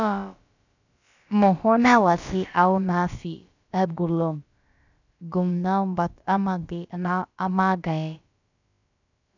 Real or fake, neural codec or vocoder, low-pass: fake; codec, 16 kHz, about 1 kbps, DyCAST, with the encoder's durations; 7.2 kHz